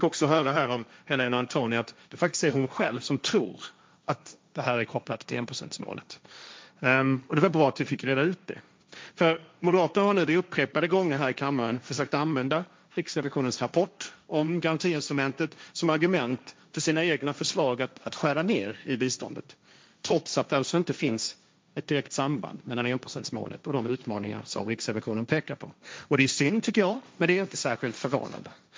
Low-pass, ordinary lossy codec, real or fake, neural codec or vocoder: none; none; fake; codec, 16 kHz, 1.1 kbps, Voila-Tokenizer